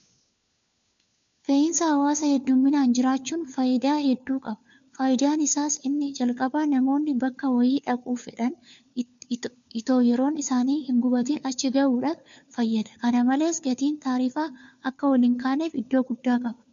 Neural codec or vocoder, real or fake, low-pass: codec, 16 kHz, 4 kbps, FunCodec, trained on LibriTTS, 50 frames a second; fake; 7.2 kHz